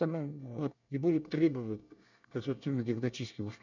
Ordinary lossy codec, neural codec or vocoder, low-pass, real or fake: none; codec, 24 kHz, 1 kbps, SNAC; 7.2 kHz; fake